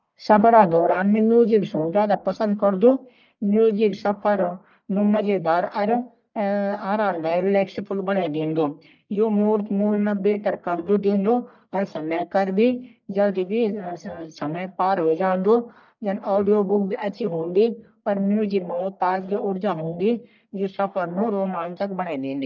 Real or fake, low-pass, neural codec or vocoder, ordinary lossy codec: fake; 7.2 kHz; codec, 44.1 kHz, 1.7 kbps, Pupu-Codec; none